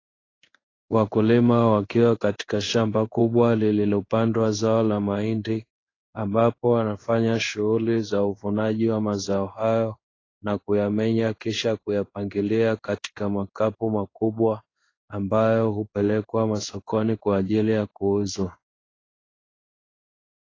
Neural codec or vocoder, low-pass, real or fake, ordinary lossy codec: codec, 16 kHz in and 24 kHz out, 1 kbps, XY-Tokenizer; 7.2 kHz; fake; AAC, 32 kbps